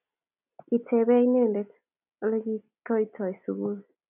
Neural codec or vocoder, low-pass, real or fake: none; 3.6 kHz; real